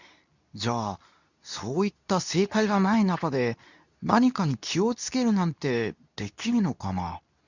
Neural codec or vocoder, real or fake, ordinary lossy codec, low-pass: codec, 24 kHz, 0.9 kbps, WavTokenizer, medium speech release version 2; fake; none; 7.2 kHz